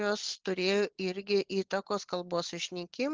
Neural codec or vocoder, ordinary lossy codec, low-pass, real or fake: none; Opus, 16 kbps; 7.2 kHz; real